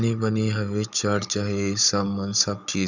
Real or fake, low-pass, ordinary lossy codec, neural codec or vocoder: fake; 7.2 kHz; none; codec, 16 kHz, 16 kbps, FunCodec, trained on Chinese and English, 50 frames a second